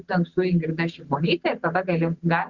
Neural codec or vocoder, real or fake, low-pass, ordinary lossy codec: none; real; 7.2 kHz; Opus, 64 kbps